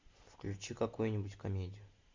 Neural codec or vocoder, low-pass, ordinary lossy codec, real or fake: none; 7.2 kHz; MP3, 48 kbps; real